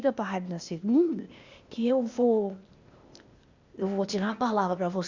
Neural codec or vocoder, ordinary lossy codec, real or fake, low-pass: codec, 16 kHz, 0.8 kbps, ZipCodec; Opus, 64 kbps; fake; 7.2 kHz